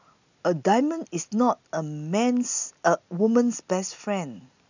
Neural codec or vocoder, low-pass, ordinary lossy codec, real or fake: none; 7.2 kHz; none; real